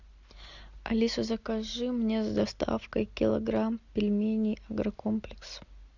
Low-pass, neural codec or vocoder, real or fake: 7.2 kHz; none; real